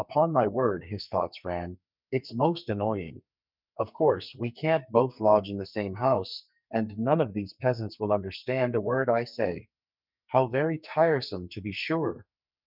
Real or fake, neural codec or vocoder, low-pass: fake; codec, 44.1 kHz, 2.6 kbps, SNAC; 5.4 kHz